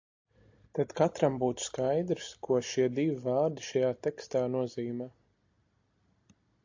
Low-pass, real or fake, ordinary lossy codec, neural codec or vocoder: 7.2 kHz; real; AAC, 48 kbps; none